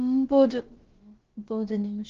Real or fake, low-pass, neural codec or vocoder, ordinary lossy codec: fake; 7.2 kHz; codec, 16 kHz, about 1 kbps, DyCAST, with the encoder's durations; Opus, 16 kbps